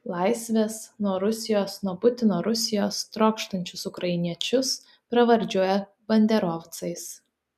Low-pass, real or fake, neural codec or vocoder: 14.4 kHz; real; none